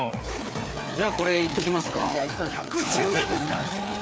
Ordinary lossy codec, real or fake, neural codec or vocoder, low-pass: none; fake; codec, 16 kHz, 4 kbps, FreqCodec, larger model; none